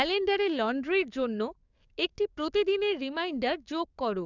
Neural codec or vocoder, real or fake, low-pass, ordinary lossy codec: codec, 44.1 kHz, 7.8 kbps, DAC; fake; 7.2 kHz; none